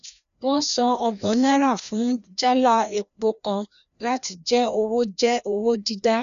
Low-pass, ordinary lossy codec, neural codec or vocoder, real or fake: 7.2 kHz; none; codec, 16 kHz, 1 kbps, FreqCodec, larger model; fake